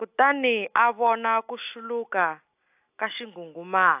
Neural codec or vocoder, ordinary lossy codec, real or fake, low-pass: none; AAC, 32 kbps; real; 3.6 kHz